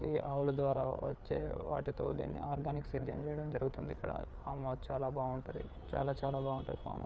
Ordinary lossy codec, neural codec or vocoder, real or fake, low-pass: none; codec, 16 kHz, 4 kbps, FreqCodec, larger model; fake; none